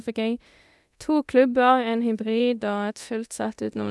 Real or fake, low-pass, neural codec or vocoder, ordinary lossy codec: fake; none; codec, 24 kHz, 0.9 kbps, DualCodec; none